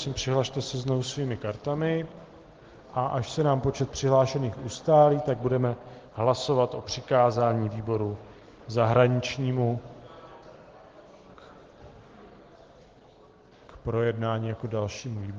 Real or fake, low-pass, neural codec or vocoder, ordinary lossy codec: real; 7.2 kHz; none; Opus, 16 kbps